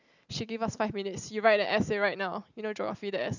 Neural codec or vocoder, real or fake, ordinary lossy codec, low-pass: none; real; none; 7.2 kHz